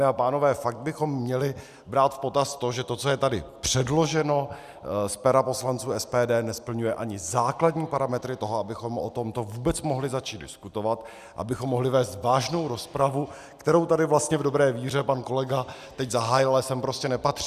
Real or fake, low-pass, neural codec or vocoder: real; 14.4 kHz; none